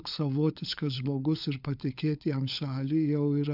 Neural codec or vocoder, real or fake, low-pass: codec, 16 kHz, 16 kbps, FunCodec, trained on LibriTTS, 50 frames a second; fake; 5.4 kHz